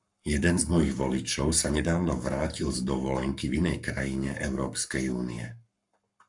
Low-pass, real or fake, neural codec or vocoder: 10.8 kHz; fake; codec, 44.1 kHz, 7.8 kbps, Pupu-Codec